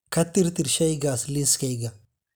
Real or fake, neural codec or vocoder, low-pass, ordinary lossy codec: real; none; none; none